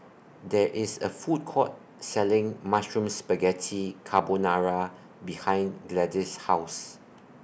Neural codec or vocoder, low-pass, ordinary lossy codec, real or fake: none; none; none; real